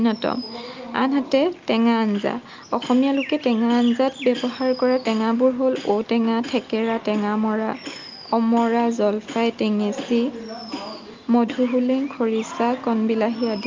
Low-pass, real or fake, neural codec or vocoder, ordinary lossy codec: 7.2 kHz; real; none; Opus, 24 kbps